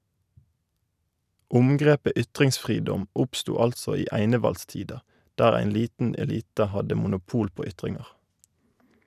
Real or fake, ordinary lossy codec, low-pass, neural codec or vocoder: real; none; 14.4 kHz; none